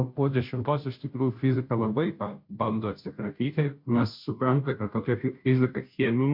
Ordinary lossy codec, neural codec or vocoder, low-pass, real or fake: MP3, 48 kbps; codec, 16 kHz, 0.5 kbps, FunCodec, trained on Chinese and English, 25 frames a second; 5.4 kHz; fake